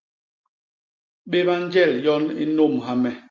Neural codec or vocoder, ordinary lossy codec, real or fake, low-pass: none; Opus, 32 kbps; real; 7.2 kHz